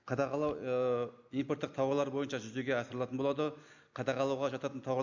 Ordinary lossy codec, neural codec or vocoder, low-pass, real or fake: none; none; 7.2 kHz; real